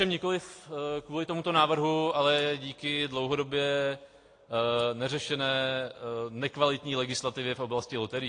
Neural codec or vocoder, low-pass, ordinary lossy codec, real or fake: none; 9.9 kHz; AAC, 48 kbps; real